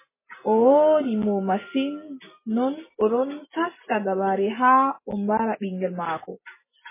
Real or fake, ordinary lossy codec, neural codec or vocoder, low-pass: real; MP3, 16 kbps; none; 3.6 kHz